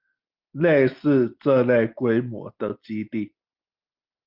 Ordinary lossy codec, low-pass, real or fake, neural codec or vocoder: Opus, 32 kbps; 5.4 kHz; real; none